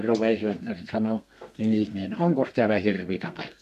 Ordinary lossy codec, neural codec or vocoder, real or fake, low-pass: none; codec, 32 kHz, 1.9 kbps, SNAC; fake; 14.4 kHz